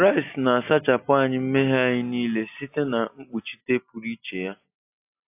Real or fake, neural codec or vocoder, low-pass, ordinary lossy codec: real; none; 3.6 kHz; AAC, 32 kbps